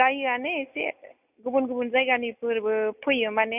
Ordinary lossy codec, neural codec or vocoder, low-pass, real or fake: none; none; 3.6 kHz; real